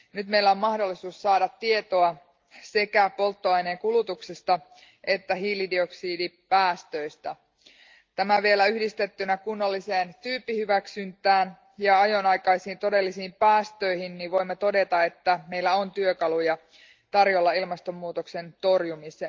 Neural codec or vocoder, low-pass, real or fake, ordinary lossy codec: none; 7.2 kHz; real; Opus, 32 kbps